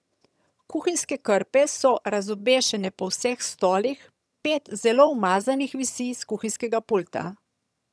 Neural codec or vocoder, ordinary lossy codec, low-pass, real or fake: vocoder, 22.05 kHz, 80 mel bands, HiFi-GAN; none; none; fake